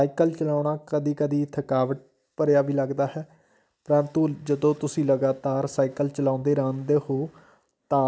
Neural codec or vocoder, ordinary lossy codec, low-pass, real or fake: none; none; none; real